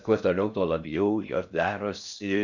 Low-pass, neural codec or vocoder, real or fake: 7.2 kHz; codec, 16 kHz in and 24 kHz out, 0.6 kbps, FocalCodec, streaming, 4096 codes; fake